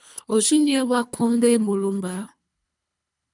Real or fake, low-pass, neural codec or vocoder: fake; 10.8 kHz; codec, 24 kHz, 3 kbps, HILCodec